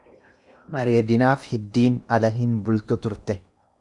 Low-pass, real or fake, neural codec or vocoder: 10.8 kHz; fake; codec, 16 kHz in and 24 kHz out, 0.8 kbps, FocalCodec, streaming, 65536 codes